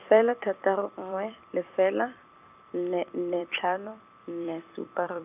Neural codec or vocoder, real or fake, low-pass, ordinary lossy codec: vocoder, 44.1 kHz, 128 mel bands every 256 samples, BigVGAN v2; fake; 3.6 kHz; none